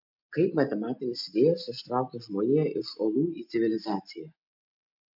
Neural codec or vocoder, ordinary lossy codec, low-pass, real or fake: none; MP3, 48 kbps; 5.4 kHz; real